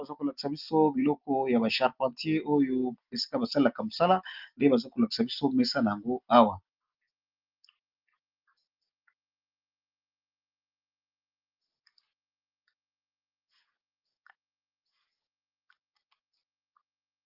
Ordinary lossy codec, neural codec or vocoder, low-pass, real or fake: Opus, 32 kbps; none; 5.4 kHz; real